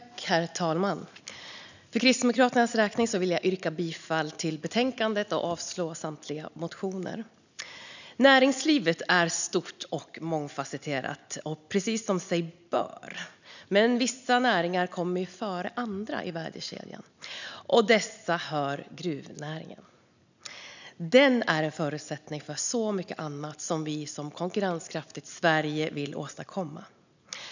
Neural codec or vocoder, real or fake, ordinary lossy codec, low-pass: none; real; none; 7.2 kHz